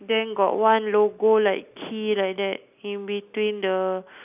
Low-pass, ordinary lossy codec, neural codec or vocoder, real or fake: 3.6 kHz; none; none; real